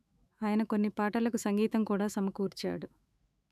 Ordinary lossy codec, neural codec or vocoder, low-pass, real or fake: none; autoencoder, 48 kHz, 128 numbers a frame, DAC-VAE, trained on Japanese speech; 14.4 kHz; fake